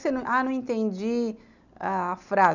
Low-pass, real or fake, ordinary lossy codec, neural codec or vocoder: 7.2 kHz; real; none; none